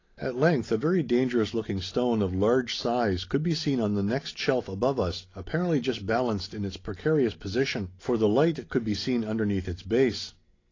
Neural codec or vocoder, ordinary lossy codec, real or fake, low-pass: none; AAC, 32 kbps; real; 7.2 kHz